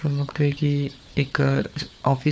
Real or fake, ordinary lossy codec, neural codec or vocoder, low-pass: fake; none; codec, 16 kHz, 4 kbps, FunCodec, trained on Chinese and English, 50 frames a second; none